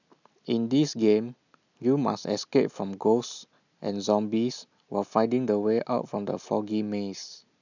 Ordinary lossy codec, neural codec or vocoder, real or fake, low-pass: none; none; real; 7.2 kHz